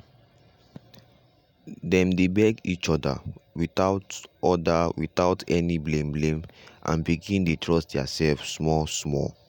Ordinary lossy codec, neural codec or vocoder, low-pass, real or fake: none; none; 19.8 kHz; real